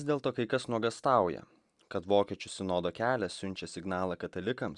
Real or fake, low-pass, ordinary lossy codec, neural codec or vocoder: real; 10.8 kHz; Opus, 64 kbps; none